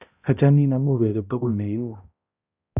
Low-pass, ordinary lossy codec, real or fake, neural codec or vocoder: 3.6 kHz; none; fake; codec, 16 kHz, 0.5 kbps, X-Codec, HuBERT features, trained on balanced general audio